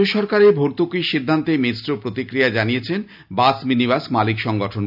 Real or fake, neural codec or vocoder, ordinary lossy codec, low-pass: real; none; none; 5.4 kHz